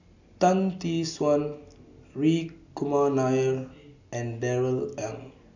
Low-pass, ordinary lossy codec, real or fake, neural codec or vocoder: 7.2 kHz; none; real; none